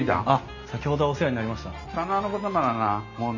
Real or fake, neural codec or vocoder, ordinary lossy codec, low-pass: real; none; none; 7.2 kHz